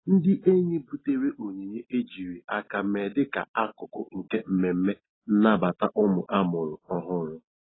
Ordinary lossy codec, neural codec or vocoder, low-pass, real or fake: AAC, 16 kbps; none; 7.2 kHz; real